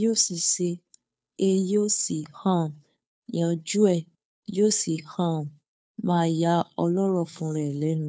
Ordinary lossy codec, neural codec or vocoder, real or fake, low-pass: none; codec, 16 kHz, 2 kbps, FunCodec, trained on Chinese and English, 25 frames a second; fake; none